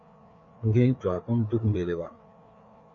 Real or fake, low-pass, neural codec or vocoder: fake; 7.2 kHz; codec, 16 kHz, 4 kbps, FreqCodec, larger model